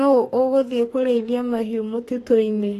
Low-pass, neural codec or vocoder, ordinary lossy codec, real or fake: 14.4 kHz; codec, 32 kHz, 1.9 kbps, SNAC; AAC, 48 kbps; fake